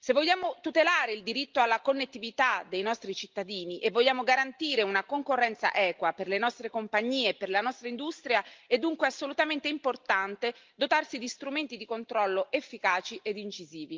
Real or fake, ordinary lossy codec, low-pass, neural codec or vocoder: real; Opus, 32 kbps; 7.2 kHz; none